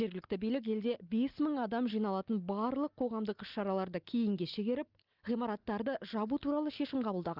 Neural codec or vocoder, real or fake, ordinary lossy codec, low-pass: none; real; Opus, 24 kbps; 5.4 kHz